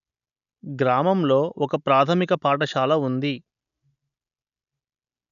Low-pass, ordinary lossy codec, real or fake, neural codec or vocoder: 7.2 kHz; none; real; none